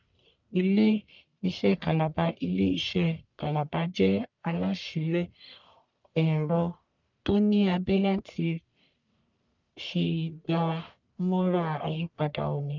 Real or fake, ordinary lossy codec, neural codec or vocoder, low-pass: fake; none; codec, 44.1 kHz, 1.7 kbps, Pupu-Codec; 7.2 kHz